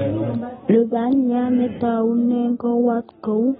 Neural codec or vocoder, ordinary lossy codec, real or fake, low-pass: none; AAC, 16 kbps; real; 19.8 kHz